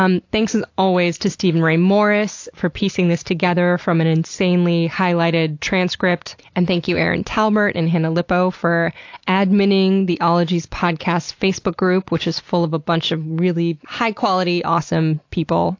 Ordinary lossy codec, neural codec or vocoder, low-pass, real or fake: AAC, 48 kbps; none; 7.2 kHz; real